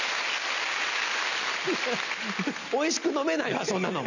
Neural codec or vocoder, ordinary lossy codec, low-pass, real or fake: none; none; 7.2 kHz; real